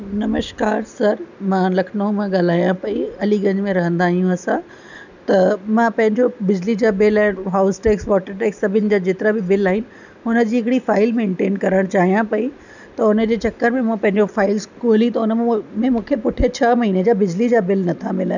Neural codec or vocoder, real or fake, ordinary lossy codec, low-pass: none; real; none; 7.2 kHz